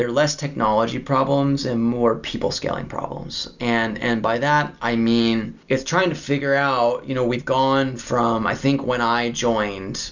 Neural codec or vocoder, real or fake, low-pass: none; real; 7.2 kHz